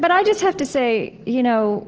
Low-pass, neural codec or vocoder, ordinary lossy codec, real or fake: 7.2 kHz; none; Opus, 16 kbps; real